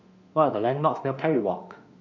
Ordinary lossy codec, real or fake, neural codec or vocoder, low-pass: none; fake; autoencoder, 48 kHz, 32 numbers a frame, DAC-VAE, trained on Japanese speech; 7.2 kHz